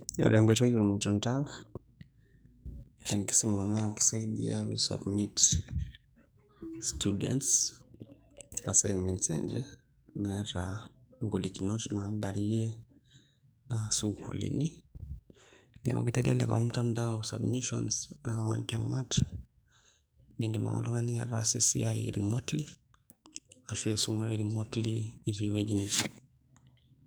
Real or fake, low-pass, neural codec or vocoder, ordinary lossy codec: fake; none; codec, 44.1 kHz, 2.6 kbps, SNAC; none